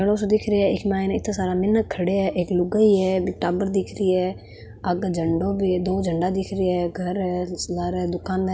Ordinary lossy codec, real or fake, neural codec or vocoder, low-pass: none; real; none; none